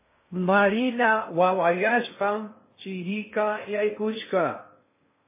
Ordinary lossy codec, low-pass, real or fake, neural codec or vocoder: MP3, 16 kbps; 3.6 kHz; fake; codec, 16 kHz in and 24 kHz out, 0.6 kbps, FocalCodec, streaming, 2048 codes